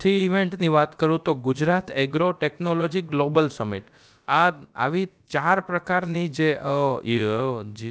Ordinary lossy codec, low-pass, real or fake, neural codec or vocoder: none; none; fake; codec, 16 kHz, about 1 kbps, DyCAST, with the encoder's durations